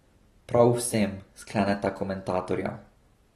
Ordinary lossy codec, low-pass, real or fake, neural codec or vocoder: AAC, 32 kbps; 19.8 kHz; real; none